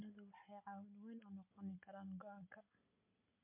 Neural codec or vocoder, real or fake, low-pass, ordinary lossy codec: none; real; 3.6 kHz; MP3, 24 kbps